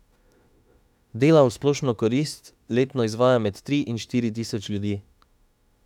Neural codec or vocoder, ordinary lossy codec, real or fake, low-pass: autoencoder, 48 kHz, 32 numbers a frame, DAC-VAE, trained on Japanese speech; none; fake; 19.8 kHz